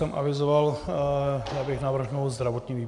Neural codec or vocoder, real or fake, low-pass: none; real; 10.8 kHz